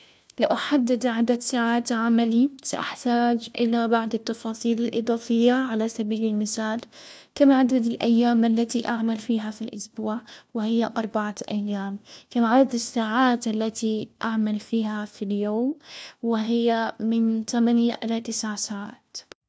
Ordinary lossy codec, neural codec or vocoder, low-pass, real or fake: none; codec, 16 kHz, 1 kbps, FunCodec, trained on LibriTTS, 50 frames a second; none; fake